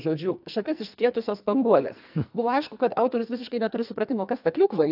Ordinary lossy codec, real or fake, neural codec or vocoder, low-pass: AAC, 48 kbps; fake; codec, 44.1 kHz, 2.6 kbps, SNAC; 5.4 kHz